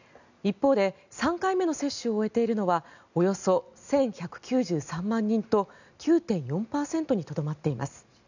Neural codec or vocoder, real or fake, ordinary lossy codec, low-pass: none; real; none; 7.2 kHz